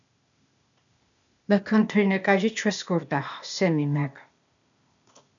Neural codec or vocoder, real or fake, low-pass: codec, 16 kHz, 0.8 kbps, ZipCodec; fake; 7.2 kHz